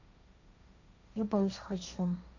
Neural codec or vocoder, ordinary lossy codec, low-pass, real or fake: codec, 16 kHz, 1.1 kbps, Voila-Tokenizer; none; 7.2 kHz; fake